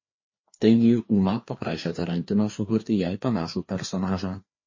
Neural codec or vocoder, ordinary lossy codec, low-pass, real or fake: codec, 16 kHz, 2 kbps, FreqCodec, larger model; MP3, 32 kbps; 7.2 kHz; fake